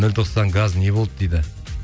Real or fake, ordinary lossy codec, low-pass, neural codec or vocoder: real; none; none; none